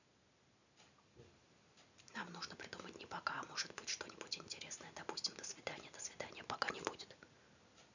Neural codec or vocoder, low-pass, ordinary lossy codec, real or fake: none; 7.2 kHz; none; real